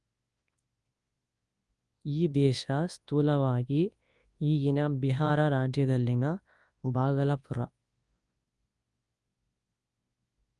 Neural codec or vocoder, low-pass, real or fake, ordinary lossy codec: codec, 24 kHz, 0.9 kbps, WavTokenizer, large speech release; 10.8 kHz; fake; Opus, 32 kbps